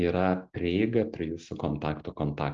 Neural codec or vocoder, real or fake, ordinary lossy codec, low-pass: none; real; Opus, 32 kbps; 7.2 kHz